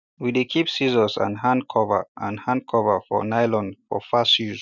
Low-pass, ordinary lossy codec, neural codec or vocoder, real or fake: 7.2 kHz; none; none; real